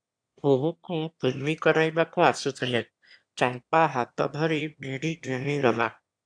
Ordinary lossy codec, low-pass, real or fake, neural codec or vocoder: AAC, 64 kbps; 9.9 kHz; fake; autoencoder, 22.05 kHz, a latent of 192 numbers a frame, VITS, trained on one speaker